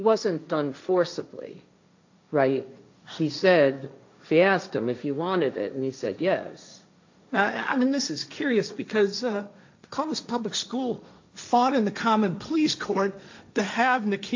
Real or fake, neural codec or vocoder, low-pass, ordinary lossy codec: fake; codec, 16 kHz, 1.1 kbps, Voila-Tokenizer; 7.2 kHz; AAC, 48 kbps